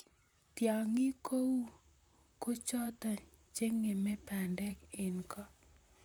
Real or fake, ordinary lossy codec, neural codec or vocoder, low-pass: fake; none; vocoder, 44.1 kHz, 128 mel bands, Pupu-Vocoder; none